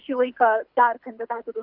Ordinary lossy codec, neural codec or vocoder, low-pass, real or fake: Opus, 64 kbps; codec, 24 kHz, 6 kbps, HILCodec; 5.4 kHz; fake